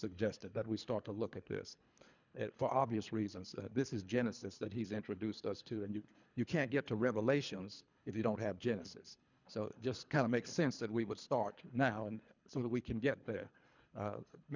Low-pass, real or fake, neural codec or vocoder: 7.2 kHz; fake; codec, 24 kHz, 3 kbps, HILCodec